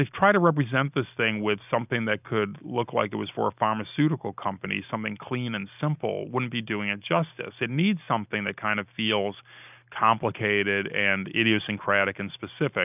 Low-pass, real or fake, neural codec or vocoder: 3.6 kHz; real; none